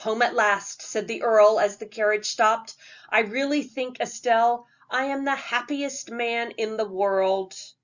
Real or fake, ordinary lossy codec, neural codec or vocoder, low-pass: real; Opus, 64 kbps; none; 7.2 kHz